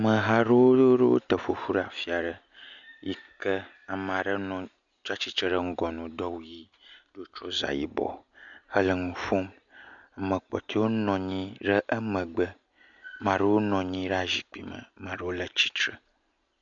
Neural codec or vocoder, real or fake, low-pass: none; real; 7.2 kHz